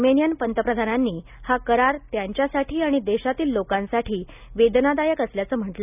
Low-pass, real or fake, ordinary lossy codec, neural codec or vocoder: 3.6 kHz; real; none; none